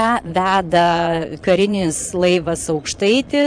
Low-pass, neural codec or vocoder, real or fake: 9.9 kHz; vocoder, 22.05 kHz, 80 mel bands, Vocos; fake